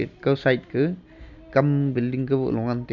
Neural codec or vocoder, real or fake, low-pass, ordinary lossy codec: none; real; 7.2 kHz; none